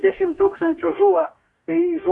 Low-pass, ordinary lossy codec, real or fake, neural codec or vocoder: 10.8 kHz; Opus, 64 kbps; fake; codec, 44.1 kHz, 2.6 kbps, DAC